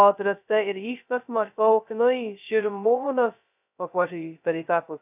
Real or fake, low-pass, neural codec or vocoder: fake; 3.6 kHz; codec, 16 kHz, 0.2 kbps, FocalCodec